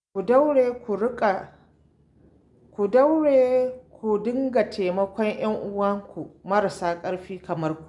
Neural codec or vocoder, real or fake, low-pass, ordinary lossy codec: none; real; 10.8 kHz; none